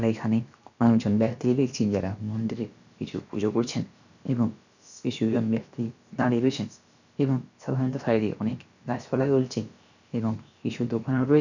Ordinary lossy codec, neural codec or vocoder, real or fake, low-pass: none; codec, 16 kHz, about 1 kbps, DyCAST, with the encoder's durations; fake; 7.2 kHz